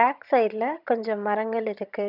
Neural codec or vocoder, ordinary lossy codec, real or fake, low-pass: vocoder, 22.05 kHz, 80 mel bands, HiFi-GAN; none; fake; 5.4 kHz